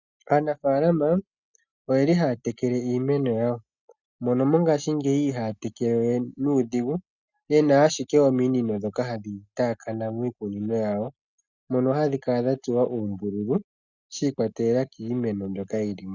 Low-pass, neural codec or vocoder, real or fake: 7.2 kHz; none; real